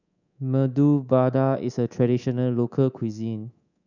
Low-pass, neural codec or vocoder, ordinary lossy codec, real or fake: 7.2 kHz; codec, 24 kHz, 3.1 kbps, DualCodec; none; fake